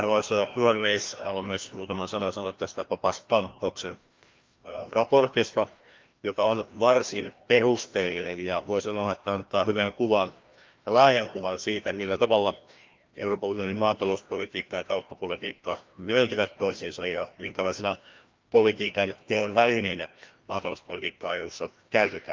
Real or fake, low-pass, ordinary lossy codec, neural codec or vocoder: fake; 7.2 kHz; Opus, 24 kbps; codec, 16 kHz, 1 kbps, FreqCodec, larger model